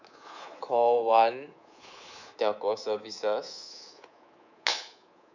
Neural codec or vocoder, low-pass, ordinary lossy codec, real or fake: codec, 24 kHz, 3.1 kbps, DualCodec; 7.2 kHz; none; fake